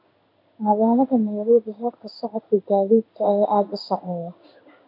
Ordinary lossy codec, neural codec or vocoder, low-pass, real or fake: none; codec, 16 kHz in and 24 kHz out, 1 kbps, XY-Tokenizer; 5.4 kHz; fake